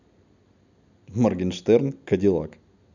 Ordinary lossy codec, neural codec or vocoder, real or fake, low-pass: none; none; real; 7.2 kHz